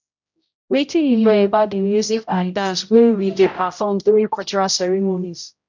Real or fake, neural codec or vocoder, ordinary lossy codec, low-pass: fake; codec, 16 kHz, 0.5 kbps, X-Codec, HuBERT features, trained on general audio; none; 7.2 kHz